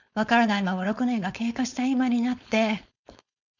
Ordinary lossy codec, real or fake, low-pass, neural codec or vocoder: none; fake; 7.2 kHz; codec, 16 kHz, 4.8 kbps, FACodec